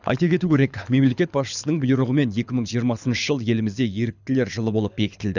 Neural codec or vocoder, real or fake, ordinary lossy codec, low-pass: codec, 24 kHz, 6 kbps, HILCodec; fake; none; 7.2 kHz